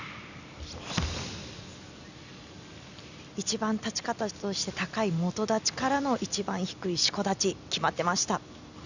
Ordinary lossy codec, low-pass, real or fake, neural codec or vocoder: none; 7.2 kHz; real; none